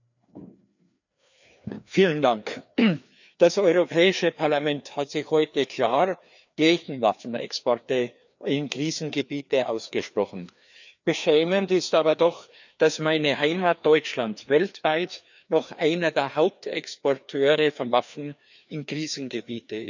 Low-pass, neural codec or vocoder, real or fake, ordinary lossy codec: 7.2 kHz; codec, 16 kHz, 2 kbps, FreqCodec, larger model; fake; none